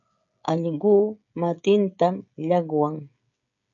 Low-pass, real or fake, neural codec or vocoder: 7.2 kHz; fake; codec, 16 kHz, 16 kbps, FreqCodec, smaller model